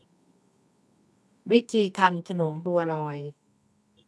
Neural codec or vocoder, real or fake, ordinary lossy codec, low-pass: codec, 24 kHz, 0.9 kbps, WavTokenizer, medium music audio release; fake; none; none